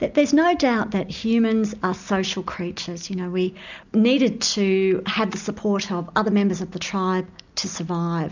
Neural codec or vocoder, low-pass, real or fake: none; 7.2 kHz; real